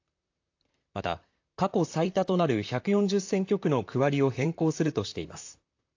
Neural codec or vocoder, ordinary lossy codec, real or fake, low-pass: vocoder, 44.1 kHz, 128 mel bands, Pupu-Vocoder; AAC, 48 kbps; fake; 7.2 kHz